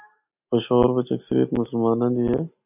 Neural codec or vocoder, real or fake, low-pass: none; real; 3.6 kHz